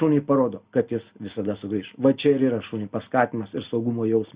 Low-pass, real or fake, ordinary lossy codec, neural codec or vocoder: 3.6 kHz; real; Opus, 64 kbps; none